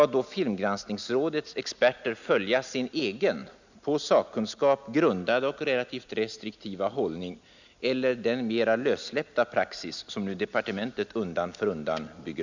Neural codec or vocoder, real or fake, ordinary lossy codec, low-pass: none; real; none; 7.2 kHz